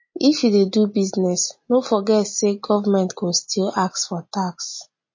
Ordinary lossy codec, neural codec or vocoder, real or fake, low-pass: MP3, 32 kbps; none; real; 7.2 kHz